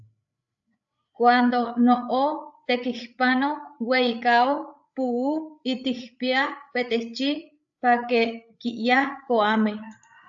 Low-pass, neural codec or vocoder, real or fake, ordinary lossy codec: 7.2 kHz; codec, 16 kHz, 8 kbps, FreqCodec, larger model; fake; AAC, 64 kbps